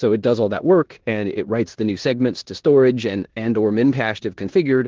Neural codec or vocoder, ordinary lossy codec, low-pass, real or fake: codec, 16 kHz in and 24 kHz out, 0.9 kbps, LongCat-Audio-Codec, four codebook decoder; Opus, 16 kbps; 7.2 kHz; fake